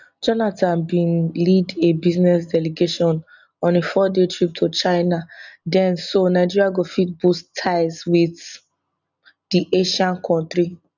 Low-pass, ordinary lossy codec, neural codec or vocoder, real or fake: 7.2 kHz; none; none; real